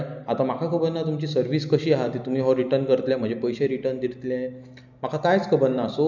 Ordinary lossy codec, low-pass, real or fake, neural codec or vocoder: none; 7.2 kHz; real; none